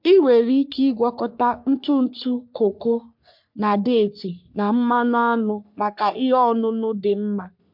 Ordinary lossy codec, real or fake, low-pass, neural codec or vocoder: MP3, 48 kbps; fake; 5.4 kHz; codec, 44.1 kHz, 3.4 kbps, Pupu-Codec